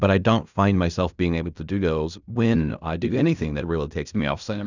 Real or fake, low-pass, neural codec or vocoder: fake; 7.2 kHz; codec, 16 kHz in and 24 kHz out, 0.4 kbps, LongCat-Audio-Codec, fine tuned four codebook decoder